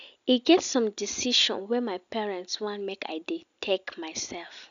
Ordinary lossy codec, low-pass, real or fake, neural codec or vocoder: none; 7.2 kHz; real; none